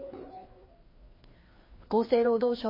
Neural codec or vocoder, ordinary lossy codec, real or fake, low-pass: codec, 16 kHz, 2 kbps, FreqCodec, larger model; MP3, 24 kbps; fake; 5.4 kHz